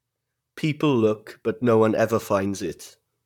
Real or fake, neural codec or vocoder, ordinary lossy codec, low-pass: fake; vocoder, 44.1 kHz, 128 mel bands, Pupu-Vocoder; none; 19.8 kHz